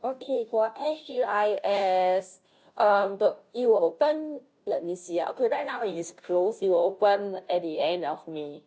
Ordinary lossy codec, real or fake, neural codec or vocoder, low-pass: none; fake; codec, 16 kHz, 0.5 kbps, FunCodec, trained on Chinese and English, 25 frames a second; none